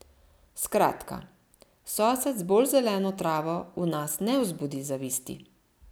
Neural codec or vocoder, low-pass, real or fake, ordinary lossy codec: none; none; real; none